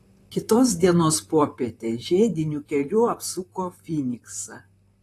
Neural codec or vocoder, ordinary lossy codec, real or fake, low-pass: vocoder, 44.1 kHz, 128 mel bands, Pupu-Vocoder; AAC, 48 kbps; fake; 14.4 kHz